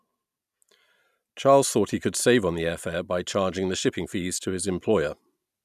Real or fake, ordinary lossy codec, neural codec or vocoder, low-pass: real; none; none; 14.4 kHz